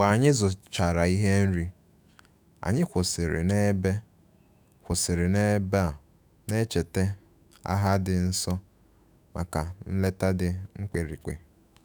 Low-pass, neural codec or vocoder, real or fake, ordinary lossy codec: none; autoencoder, 48 kHz, 128 numbers a frame, DAC-VAE, trained on Japanese speech; fake; none